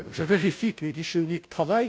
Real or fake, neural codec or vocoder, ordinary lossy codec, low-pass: fake; codec, 16 kHz, 0.5 kbps, FunCodec, trained on Chinese and English, 25 frames a second; none; none